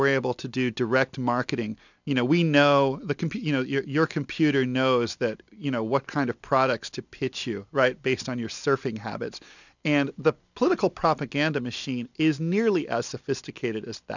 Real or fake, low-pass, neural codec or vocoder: real; 7.2 kHz; none